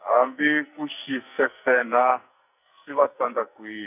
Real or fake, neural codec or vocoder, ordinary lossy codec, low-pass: fake; codec, 44.1 kHz, 2.6 kbps, SNAC; none; 3.6 kHz